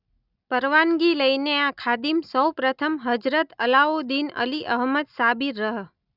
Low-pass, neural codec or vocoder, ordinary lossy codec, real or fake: 5.4 kHz; none; none; real